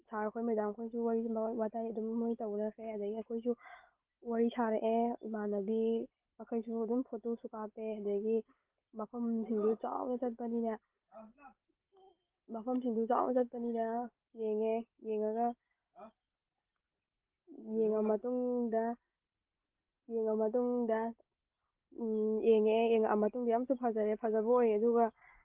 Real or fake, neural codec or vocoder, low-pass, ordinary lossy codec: real; none; 3.6 kHz; Opus, 16 kbps